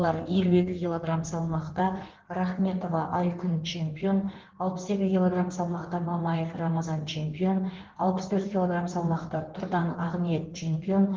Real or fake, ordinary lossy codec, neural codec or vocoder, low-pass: fake; Opus, 16 kbps; codec, 16 kHz in and 24 kHz out, 1.1 kbps, FireRedTTS-2 codec; 7.2 kHz